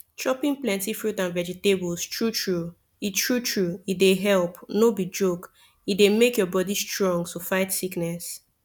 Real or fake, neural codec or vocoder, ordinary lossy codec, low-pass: real; none; none; 19.8 kHz